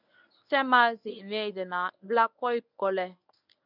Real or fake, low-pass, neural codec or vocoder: fake; 5.4 kHz; codec, 24 kHz, 0.9 kbps, WavTokenizer, medium speech release version 1